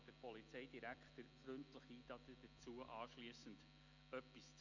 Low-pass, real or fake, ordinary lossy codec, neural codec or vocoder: 7.2 kHz; real; none; none